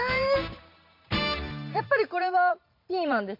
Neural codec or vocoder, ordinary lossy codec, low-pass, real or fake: vocoder, 44.1 kHz, 128 mel bands every 512 samples, BigVGAN v2; MP3, 32 kbps; 5.4 kHz; fake